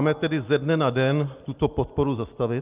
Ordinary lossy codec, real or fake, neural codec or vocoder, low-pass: Opus, 24 kbps; real; none; 3.6 kHz